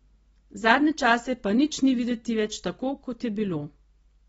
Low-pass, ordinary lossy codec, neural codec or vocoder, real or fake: 19.8 kHz; AAC, 24 kbps; none; real